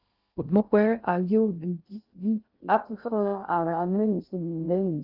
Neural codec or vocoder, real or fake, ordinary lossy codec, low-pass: codec, 16 kHz in and 24 kHz out, 0.6 kbps, FocalCodec, streaming, 2048 codes; fake; Opus, 24 kbps; 5.4 kHz